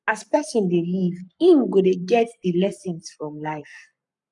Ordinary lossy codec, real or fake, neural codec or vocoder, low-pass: none; fake; codec, 44.1 kHz, 7.8 kbps, Pupu-Codec; 10.8 kHz